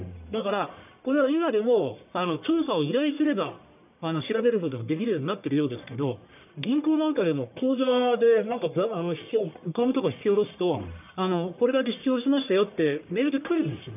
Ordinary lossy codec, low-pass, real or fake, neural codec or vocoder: none; 3.6 kHz; fake; codec, 44.1 kHz, 1.7 kbps, Pupu-Codec